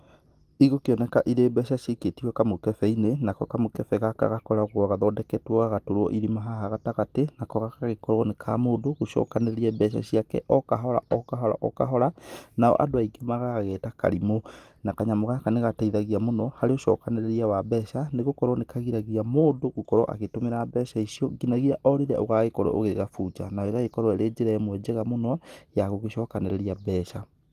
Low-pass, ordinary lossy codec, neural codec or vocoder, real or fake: 14.4 kHz; Opus, 24 kbps; none; real